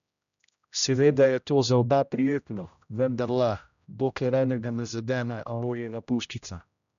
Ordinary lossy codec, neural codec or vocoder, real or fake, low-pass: none; codec, 16 kHz, 0.5 kbps, X-Codec, HuBERT features, trained on general audio; fake; 7.2 kHz